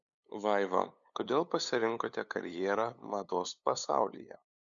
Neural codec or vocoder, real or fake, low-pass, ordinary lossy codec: codec, 16 kHz, 8 kbps, FunCodec, trained on LibriTTS, 25 frames a second; fake; 7.2 kHz; MP3, 96 kbps